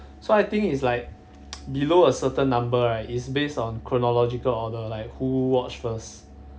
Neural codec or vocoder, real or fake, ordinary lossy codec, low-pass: none; real; none; none